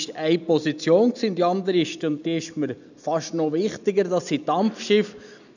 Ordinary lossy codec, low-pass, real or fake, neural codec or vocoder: none; 7.2 kHz; real; none